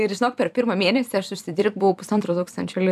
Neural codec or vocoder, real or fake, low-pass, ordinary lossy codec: vocoder, 44.1 kHz, 128 mel bands every 256 samples, BigVGAN v2; fake; 14.4 kHz; AAC, 96 kbps